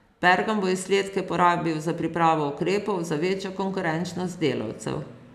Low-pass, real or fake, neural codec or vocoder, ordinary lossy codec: 14.4 kHz; real; none; none